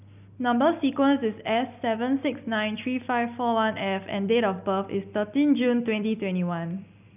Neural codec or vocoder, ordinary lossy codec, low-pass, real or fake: none; none; 3.6 kHz; real